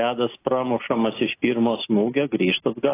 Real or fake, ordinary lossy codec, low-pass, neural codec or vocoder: real; AAC, 16 kbps; 3.6 kHz; none